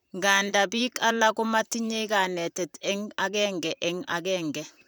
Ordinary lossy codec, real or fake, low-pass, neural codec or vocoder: none; fake; none; vocoder, 44.1 kHz, 128 mel bands, Pupu-Vocoder